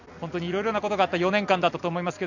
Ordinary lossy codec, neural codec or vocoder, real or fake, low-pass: none; none; real; 7.2 kHz